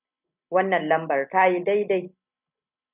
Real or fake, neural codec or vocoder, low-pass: real; none; 3.6 kHz